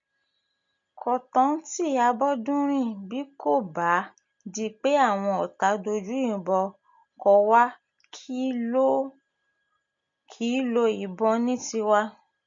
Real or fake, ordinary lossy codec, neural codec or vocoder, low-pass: real; MP3, 48 kbps; none; 7.2 kHz